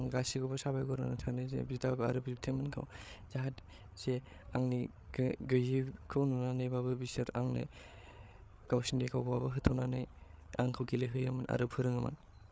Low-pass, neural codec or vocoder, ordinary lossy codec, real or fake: none; codec, 16 kHz, 8 kbps, FreqCodec, larger model; none; fake